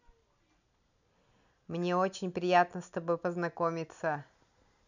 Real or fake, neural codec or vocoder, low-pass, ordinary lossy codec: real; none; 7.2 kHz; none